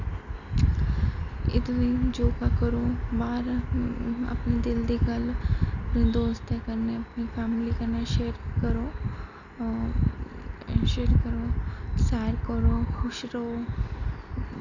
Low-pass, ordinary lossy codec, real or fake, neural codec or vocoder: 7.2 kHz; none; real; none